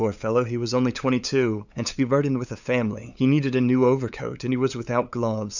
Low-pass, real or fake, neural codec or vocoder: 7.2 kHz; fake; codec, 16 kHz, 8 kbps, FunCodec, trained on LibriTTS, 25 frames a second